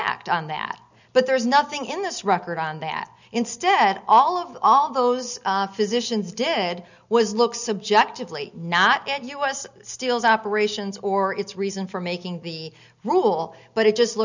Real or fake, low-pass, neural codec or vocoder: real; 7.2 kHz; none